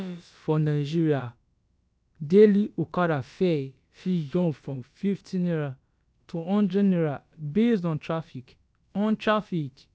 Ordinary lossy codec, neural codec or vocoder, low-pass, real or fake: none; codec, 16 kHz, about 1 kbps, DyCAST, with the encoder's durations; none; fake